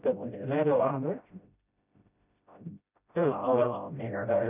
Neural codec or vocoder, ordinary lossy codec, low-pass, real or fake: codec, 16 kHz, 0.5 kbps, FreqCodec, smaller model; none; 3.6 kHz; fake